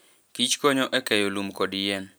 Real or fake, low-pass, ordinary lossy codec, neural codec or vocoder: real; none; none; none